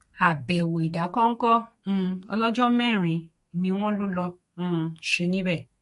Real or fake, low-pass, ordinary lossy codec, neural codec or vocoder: fake; 14.4 kHz; MP3, 48 kbps; codec, 32 kHz, 1.9 kbps, SNAC